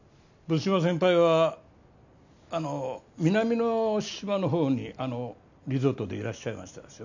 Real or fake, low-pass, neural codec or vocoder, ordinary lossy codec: real; 7.2 kHz; none; none